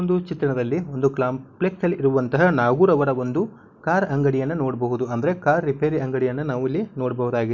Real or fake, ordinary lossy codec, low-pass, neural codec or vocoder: real; none; 7.2 kHz; none